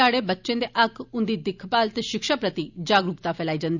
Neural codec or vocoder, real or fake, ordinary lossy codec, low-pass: none; real; none; 7.2 kHz